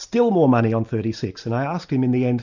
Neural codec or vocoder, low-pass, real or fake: none; 7.2 kHz; real